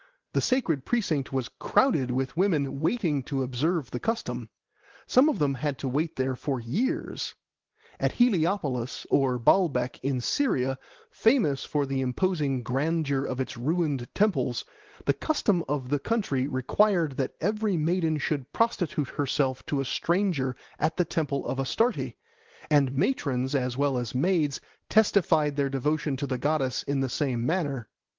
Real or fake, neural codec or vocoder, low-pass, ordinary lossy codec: real; none; 7.2 kHz; Opus, 16 kbps